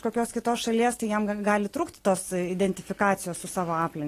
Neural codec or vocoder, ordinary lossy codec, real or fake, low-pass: none; AAC, 48 kbps; real; 14.4 kHz